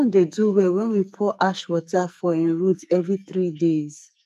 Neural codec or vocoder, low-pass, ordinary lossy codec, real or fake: codec, 44.1 kHz, 2.6 kbps, SNAC; 14.4 kHz; none; fake